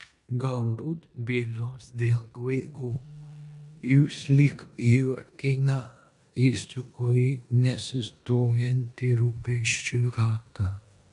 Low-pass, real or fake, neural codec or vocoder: 10.8 kHz; fake; codec, 16 kHz in and 24 kHz out, 0.9 kbps, LongCat-Audio-Codec, four codebook decoder